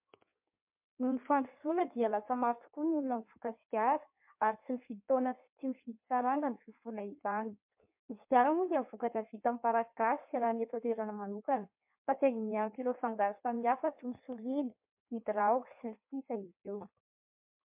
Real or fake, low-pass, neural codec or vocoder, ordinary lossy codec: fake; 3.6 kHz; codec, 16 kHz in and 24 kHz out, 1.1 kbps, FireRedTTS-2 codec; MP3, 32 kbps